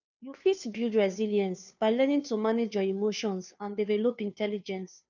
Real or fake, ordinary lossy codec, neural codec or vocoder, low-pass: fake; none; codec, 16 kHz, 2 kbps, FunCodec, trained on Chinese and English, 25 frames a second; 7.2 kHz